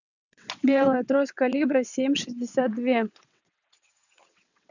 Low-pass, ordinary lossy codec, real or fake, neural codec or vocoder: 7.2 kHz; none; fake; vocoder, 22.05 kHz, 80 mel bands, WaveNeXt